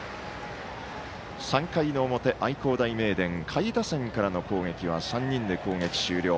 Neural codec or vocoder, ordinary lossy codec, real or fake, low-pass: none; none; real; none